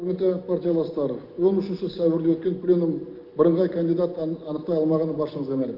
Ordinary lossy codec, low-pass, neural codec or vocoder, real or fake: Opus, 32 kbps; 5.4 kHz; none; real